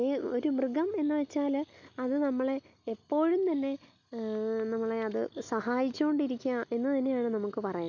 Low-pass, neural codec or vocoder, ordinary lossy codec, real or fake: 7.2 kHz; none; none; real